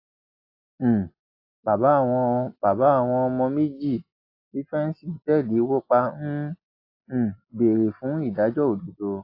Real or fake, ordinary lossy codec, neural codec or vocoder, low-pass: real; AAC, 32 kbps; none; 5.4 kHz